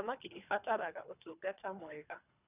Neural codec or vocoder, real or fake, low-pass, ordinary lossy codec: codec, 24 kHz, 3 kbps, HILCodec; fake; 3.6 kHz; none